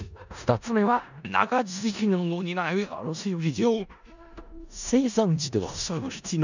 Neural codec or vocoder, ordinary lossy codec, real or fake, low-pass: codec, 16 kHz in and 24 kHz out, 0.4 kbps, LongCat-Audio-Codec, four codebook decoder; none; fake; 7.2 kHz